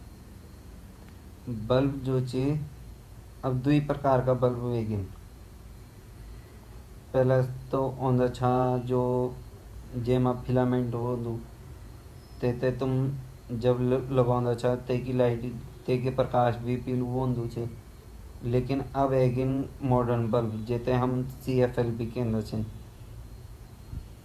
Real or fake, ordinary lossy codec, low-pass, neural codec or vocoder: fake; Opus, 64 kbps; 14.4 kHz; vocoder, 48 kHz, 128 mel bands, Vocos